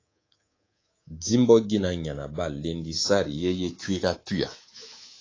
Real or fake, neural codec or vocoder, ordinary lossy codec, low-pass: fake; codec, 24 kHz, 3.1 kbps, DualCodec; AAC, 32 kbps; 7.2 kHz